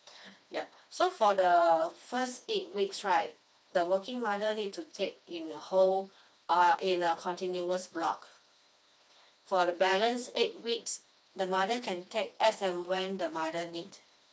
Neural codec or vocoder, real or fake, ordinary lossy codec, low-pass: codec, 16 kHz, 2 kbps, FreqCodec, smaller model; fake; none; none